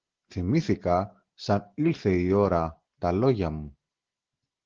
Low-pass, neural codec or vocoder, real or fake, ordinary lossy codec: 7.2 kHz; none; real; Opus, 16 kbps